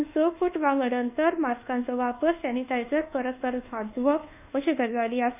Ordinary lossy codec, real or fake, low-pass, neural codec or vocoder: none; fake; 3.6 kHz; codec, 24 kHz, 1.2 kbps, DualCodec